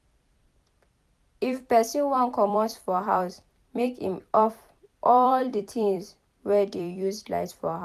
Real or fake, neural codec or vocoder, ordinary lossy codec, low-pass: fake; vocoder, 44.1 kHz, 128 mel bands every 256 samples, BigVGAN v2; none; 14.4 kHz